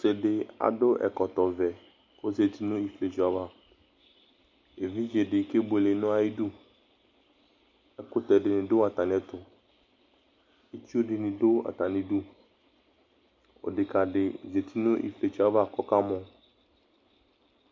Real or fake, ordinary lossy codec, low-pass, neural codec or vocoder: real; MP3, 48 kbps; 7.2 kHz; none